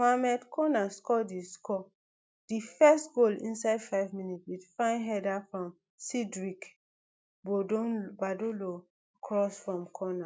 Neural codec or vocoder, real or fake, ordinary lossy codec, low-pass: none; real; none; none